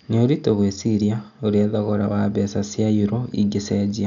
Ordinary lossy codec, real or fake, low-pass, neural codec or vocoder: none; real; 7.2 kHz; none